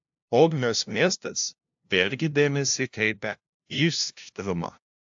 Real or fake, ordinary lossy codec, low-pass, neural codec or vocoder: fake; AAC, 64 kbps; 7.2 kHz; codec, 16 kHz, 0.5 kbps, FunCodec, trained on LibriTTS, 25 frames a second